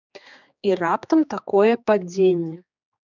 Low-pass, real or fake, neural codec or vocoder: 7.2 kHz; fake; codec, 16 kHz, 2 kbps, X-Codec, HuBERT features, trained on general audio